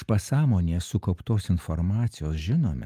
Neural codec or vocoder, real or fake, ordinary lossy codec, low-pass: none; real; Opus, 32 kbps; 14.4 kHz